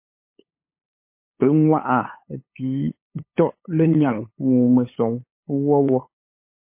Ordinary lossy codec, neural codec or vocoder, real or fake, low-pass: MP3, 24 kbps; codec, 16 kHz, 8 kbps, FunCodec, trained on LibriTTS, 25 frames a second; fake; 3.6 kHz